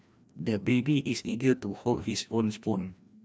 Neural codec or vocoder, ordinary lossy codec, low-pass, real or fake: codec, 16 kHz, 1 kbps, FreqCodec, larger model; none; none; fake